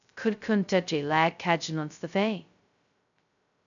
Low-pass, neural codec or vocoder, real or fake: 7.2 kHz; codec, 16 kHz, 0.2 kbps, FocalCodec; fake